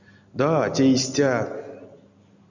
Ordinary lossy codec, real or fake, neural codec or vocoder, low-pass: MP3, 64 kbps; real; none; 7.2 kHz